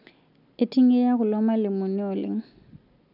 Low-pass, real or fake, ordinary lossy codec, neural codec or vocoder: 5.4 kHz; real; none; none